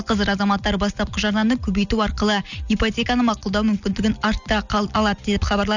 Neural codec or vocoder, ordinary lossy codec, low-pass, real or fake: none; none; 7.2 kHz; real